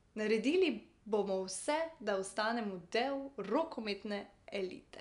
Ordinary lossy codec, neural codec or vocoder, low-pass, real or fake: none; none; 10.8 kHz; real